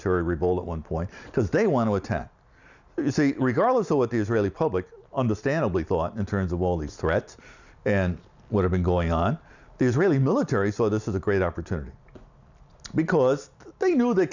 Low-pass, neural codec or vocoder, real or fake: 7.2 kHz; none; real